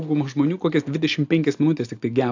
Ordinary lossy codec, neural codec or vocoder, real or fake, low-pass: AAC, 48 kbps; none; real; 7.2 kHz